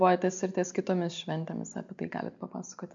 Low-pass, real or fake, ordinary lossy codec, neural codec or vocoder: 7.2 kHz; real; MP3, 64 kbps; none